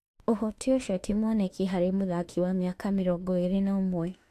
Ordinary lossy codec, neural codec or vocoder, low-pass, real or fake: AAC, 64 kbps; autoencoder, 48 kHz, 32 numbers a frame, DAC-VAE, trained on Japanese speech; 14.4 kHz; fake